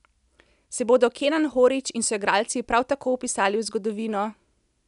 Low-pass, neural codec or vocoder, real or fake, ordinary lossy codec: 10.8 kHz; none; real; none